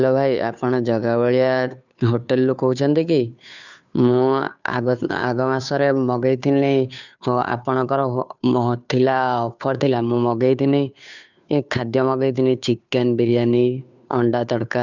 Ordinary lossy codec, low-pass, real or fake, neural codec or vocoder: none; 7.2 kHz; fake; codec, 16 kHz, 2 kbps, FunCodec, trained on Chinese and English, 25 frames a second